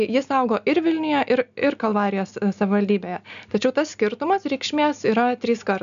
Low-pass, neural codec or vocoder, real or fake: 7.2 kHz; none; real